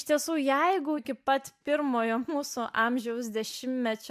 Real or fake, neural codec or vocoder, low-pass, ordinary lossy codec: real; none; 14.4 kHz; MP3, 96 kbps